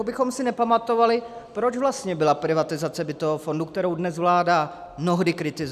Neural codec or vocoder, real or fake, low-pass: none; real; 14.4 kHz